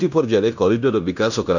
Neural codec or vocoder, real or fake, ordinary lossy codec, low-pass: codec, 16 kHz in and 24 kHz out, 0.9 kbps, LongCat-Audio-Codec, fine tuned four codebook decoder; fake; none; 7.2 kHz